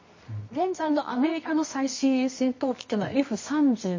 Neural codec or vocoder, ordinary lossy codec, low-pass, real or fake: codec, 24 kHz, 0.9 kbps, WavTokenizer, medium music audio release; MP3, 32 kbps; 7.2 kHz; fake